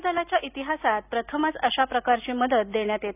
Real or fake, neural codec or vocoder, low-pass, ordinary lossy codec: real; none; 3.6 kHz; none